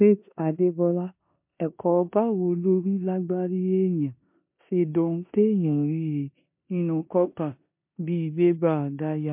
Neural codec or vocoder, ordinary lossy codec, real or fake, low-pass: codec, 16 kHz in and 24 kHz out, 0.9 kbps, LongCat-Audio-Codec, four codebook decoder; MP3, 32 kbps; fake; 3.6 kHz